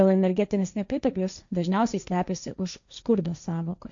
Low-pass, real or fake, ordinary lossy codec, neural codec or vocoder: 7.2 kHz; fake; MP3, 64 kbps; codec, 16 kHz, 1.1 kbps, Voila-Tokenizer